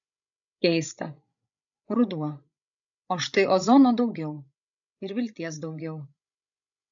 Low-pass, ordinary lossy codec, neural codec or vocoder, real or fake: 7.2 kHz; AAC, 64 kbps; codec, 16 kHz, 16 kbps, FreqCodec, larger model; fake